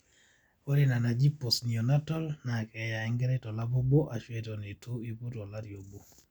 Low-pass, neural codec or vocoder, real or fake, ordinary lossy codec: 19.8 kHz; none; real; none